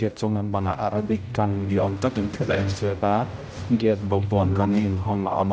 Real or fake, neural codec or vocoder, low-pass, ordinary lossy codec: fake; codec, 16 kHz, 0.5 kbps, X-Codec, HuBERT features, trained on general audio; none; none